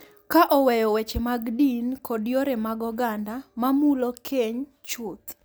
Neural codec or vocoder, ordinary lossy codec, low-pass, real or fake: none; none; none; real